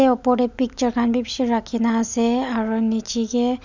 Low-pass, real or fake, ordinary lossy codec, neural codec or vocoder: 7.2 kHz; real; none; none